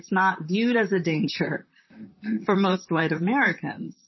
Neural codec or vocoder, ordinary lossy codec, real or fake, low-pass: vocoder, 44.1 kHz, 128 mel bands, Pupu-Vocoder; MP3, 24 kbps; fake; 7.2 kHz